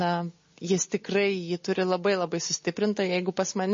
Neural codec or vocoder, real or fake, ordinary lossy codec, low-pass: none; real; MP3, 32 kbps; 7.2 kHz